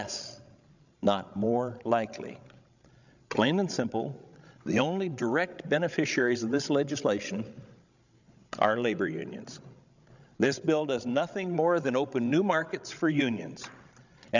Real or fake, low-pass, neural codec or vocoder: fake; 7.2 kHz; codec, 16 kHz, 16 kbps, FreqCodec, larger model